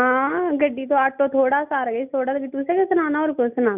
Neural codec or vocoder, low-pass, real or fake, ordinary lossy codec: none; 3.6 kHz; real; none